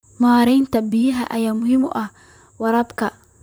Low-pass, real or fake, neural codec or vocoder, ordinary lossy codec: none; fake; vocoder, 44.1 kHz, 128 mel bands, Pupu-Vocoder; none